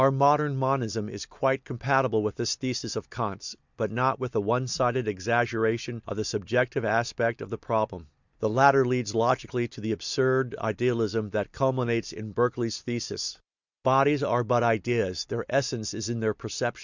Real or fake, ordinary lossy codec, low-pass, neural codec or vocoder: real; Opus, 64 kbps; 7.2 kHz; none